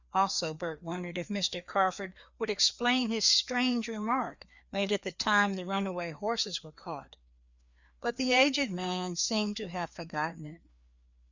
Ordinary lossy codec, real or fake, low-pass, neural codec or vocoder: Opus, 64 kbps; fake; 7.2 kHz; codec, 16 kHz, 2 kbps, FreqCodec, larger model